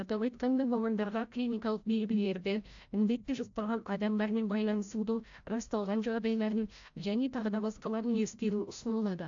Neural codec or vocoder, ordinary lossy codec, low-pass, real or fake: codec, 16 kHz, 0.5 kbps, FreqCodec, larger model; AAC, 64 kbps; 7.2 kHz; fake